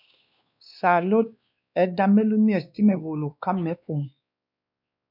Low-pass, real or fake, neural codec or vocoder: 5.4 kHz; fake; codec, 16 kHz, 2 kbps, X-Codec, WavLM features, trained on Multilingual LibriSpeech